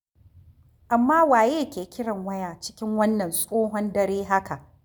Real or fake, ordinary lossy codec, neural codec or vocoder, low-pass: real; none; none; none